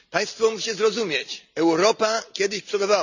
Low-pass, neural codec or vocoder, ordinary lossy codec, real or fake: 7.2 kHz; none; none; real